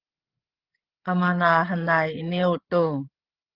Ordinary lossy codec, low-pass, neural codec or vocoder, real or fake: Opus, 16 kbps; 5.4 kHz; codec, 16 kHz, 16 kbps, FreqCodec, larger model; fake